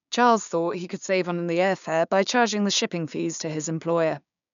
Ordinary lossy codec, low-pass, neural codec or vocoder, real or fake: MP3, 96 kbps; 7.2 kHz; codec, 16 kHz, 6 kbps, DAC; fake